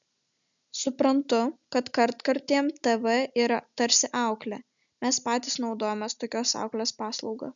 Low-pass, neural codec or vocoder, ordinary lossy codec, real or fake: 7.2 kHz; none; MP3, 96 kbps; real